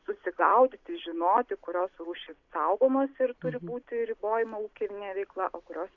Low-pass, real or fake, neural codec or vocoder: 7.2 kHz; real; none